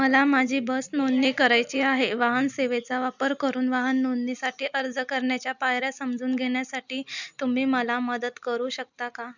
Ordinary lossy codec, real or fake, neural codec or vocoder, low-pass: none; real; none; 7.2 kHz